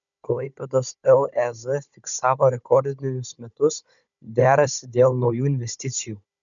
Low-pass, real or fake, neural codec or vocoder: 7.2 kHz; fake; codec, 16 kHz, 16 kbps, FunCodec, trained on Chinese and English, 50 frames a second